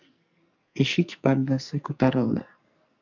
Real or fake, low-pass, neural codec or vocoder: fake; 7.2 kHz; codec, 44.1 kHz, 2.6 kbps, SNAC